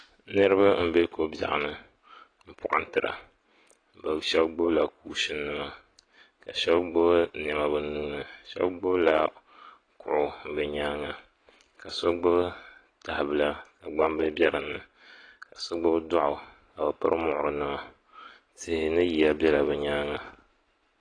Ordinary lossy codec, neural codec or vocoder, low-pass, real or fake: AAC, 32 kbps; none; 9.9 kHz; real